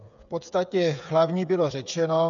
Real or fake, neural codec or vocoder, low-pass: fake; codec, 16 kHz, 8 kbps, FreqCodec, smaller model; 7.2 kHz